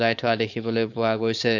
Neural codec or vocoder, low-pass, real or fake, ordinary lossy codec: codec, 16 kHz, 4.8 kbps, FACodec; 7.2 kHz; fake; none